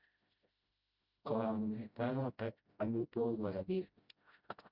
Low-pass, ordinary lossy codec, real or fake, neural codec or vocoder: 5.4 kHz; Opus, 64 kbps; fake; codec, 16 kHz, 0.5 kbps, FreqCodec, smaller model